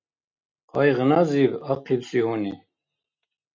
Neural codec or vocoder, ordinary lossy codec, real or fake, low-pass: none; MP3, 64 kbps; real; 7.2 kHz